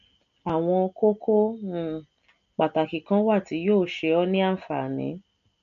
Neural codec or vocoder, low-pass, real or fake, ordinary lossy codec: none; 7.2 kHz; real; MP3, 48 kbps